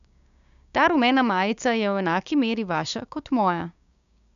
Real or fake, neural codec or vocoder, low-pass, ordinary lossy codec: fake; codec, 16 kHz, 6 kbps, DAC; 7.2 kHz; none